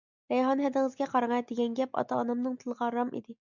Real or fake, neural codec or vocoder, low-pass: real; none; 7.2 kHz